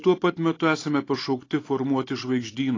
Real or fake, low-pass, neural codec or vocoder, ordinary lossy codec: real; 7.2 kHz; none; AAC, 32 kbps